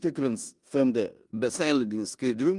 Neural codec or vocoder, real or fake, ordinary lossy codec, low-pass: codec, 16 kHz in and 24 kHz out, 0.9 kbps, LongCat-Audio-Codec, four codebook decoder; fake; Opus, 32 kbps; 10.8 kHz